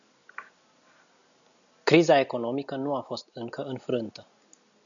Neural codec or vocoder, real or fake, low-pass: none; real; 7.2 kHz